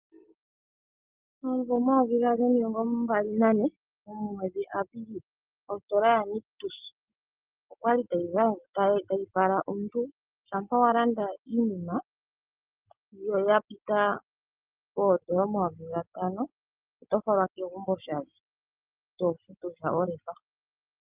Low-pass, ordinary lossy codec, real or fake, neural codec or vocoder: 3.6 kHz; Opus, 32 kbps; real; none